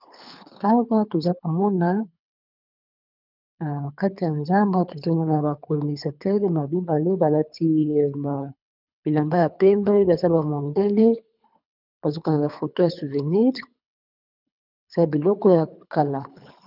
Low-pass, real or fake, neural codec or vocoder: 5.4 kHz; fake; codec, 24 kHz, 3 kbps, HILCodec